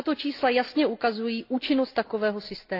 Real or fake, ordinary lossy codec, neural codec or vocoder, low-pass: real; AAC, 32 kbps; none; 5.4 kHz